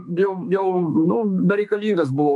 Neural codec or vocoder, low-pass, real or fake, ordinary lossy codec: autoencoder, 48 kHz, 32 numbers a frame, DAC-VAE, trained on Japanese speech; 10.8 kHz; fake; MP3, 64 kbps